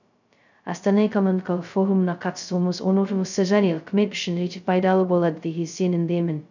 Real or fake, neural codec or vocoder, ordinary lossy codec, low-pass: fake; codec, 16 kHz, 0.2 kbps, FocalCodec; none; 7.2 kHz